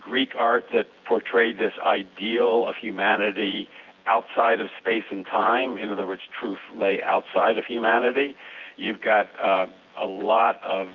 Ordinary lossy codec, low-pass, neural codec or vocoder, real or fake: Opus, 24 kbps; 7.2 kHz; vocoder, 24 kHz, 100 mel bands, Vocos; fake